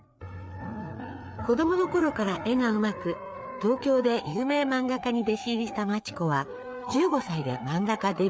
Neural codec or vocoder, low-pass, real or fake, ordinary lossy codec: codec, 16 kHz, 4 kbps, FreqCodec, larger model; none; fake; none